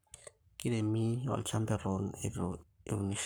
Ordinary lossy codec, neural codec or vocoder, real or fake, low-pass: none; codec, 44.1 kHz, 7.8 kbps, Pupu-Codec; fake; none